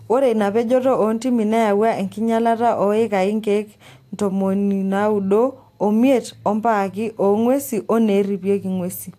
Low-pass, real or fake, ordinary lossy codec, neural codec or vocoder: 14.4 kHz; real; AAC, 64 kbps; none